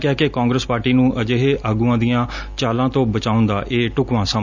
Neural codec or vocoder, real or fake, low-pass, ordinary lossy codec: none; real; 7.2 kHz; none